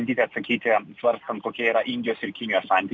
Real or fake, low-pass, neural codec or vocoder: real; 7.2 kHz; none